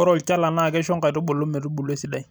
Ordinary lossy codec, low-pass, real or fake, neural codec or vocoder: none; none; real; none